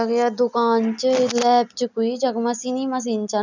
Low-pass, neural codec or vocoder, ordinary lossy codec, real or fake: 7.2 kHz; none; none; real